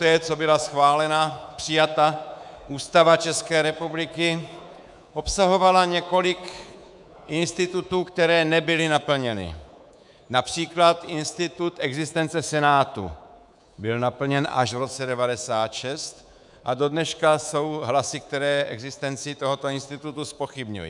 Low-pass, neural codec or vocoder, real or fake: 10.8 kHz; codec, 24 kHz, 3.1 kbps, DualCodec; fake